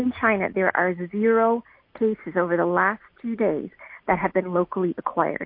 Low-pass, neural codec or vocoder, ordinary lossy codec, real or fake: 5.4 kHz; none; MP3, 32 kbps; real